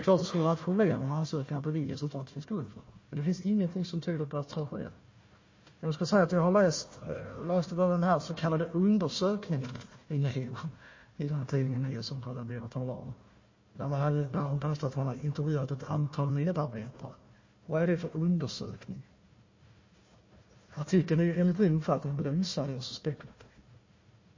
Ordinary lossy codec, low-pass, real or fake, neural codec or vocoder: MP3, 32 kbps; 7.2 kHz; fake; codec, 16 kHz, 1 kbps, FunCodec, trained on Chinese and English, 50 frames a second